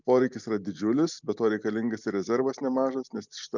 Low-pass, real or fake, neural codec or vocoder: 7.2 kHz; real; none